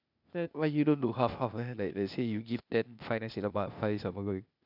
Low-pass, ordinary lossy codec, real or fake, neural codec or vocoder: 5.4 kHz; none; fake; codec, 16 kHz, 0.8 kbps, ZipCodec